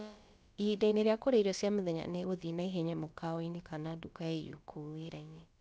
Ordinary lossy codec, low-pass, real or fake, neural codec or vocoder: none; none; fake; codec, 16 kHz, about 1 kbps, DyCAST, with the encoder's durations